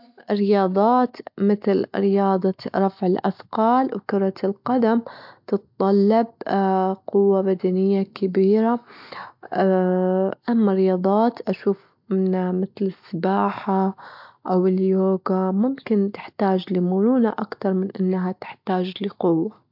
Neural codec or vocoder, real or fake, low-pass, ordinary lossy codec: none; real; 5.4 kHz; MP3, 48 kbps